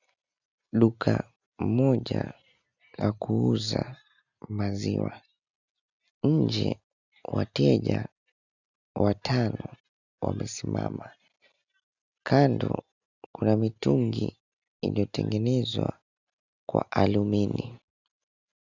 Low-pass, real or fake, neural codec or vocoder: 7.2 kHz; real; none